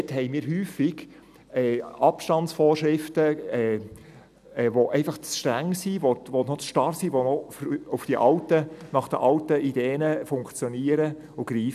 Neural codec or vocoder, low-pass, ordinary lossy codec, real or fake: none; 14.4 kHz; none; real